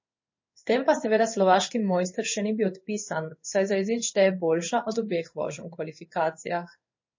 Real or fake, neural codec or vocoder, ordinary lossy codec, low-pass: fake; codec, 16 kHz in and 24 kHz out, 1 kbps, XY-Tokenizer; MP3, 32 kbps; 7.2 kHz